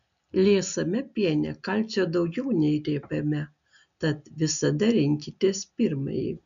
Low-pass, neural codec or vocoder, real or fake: 7.2 kHz; none; real